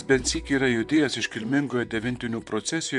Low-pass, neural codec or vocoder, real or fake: 10.8 kHz; vocoder, 44.1 kHz, 128 mel bands, Pupu-Vocoder; fake